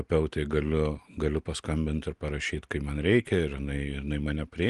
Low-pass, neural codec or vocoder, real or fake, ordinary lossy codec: 10.8 kHz; none; real; Opus, 24 kbps